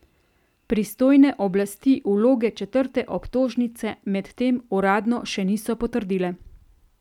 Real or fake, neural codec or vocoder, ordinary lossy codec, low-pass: real; none; none; 19.8 kHz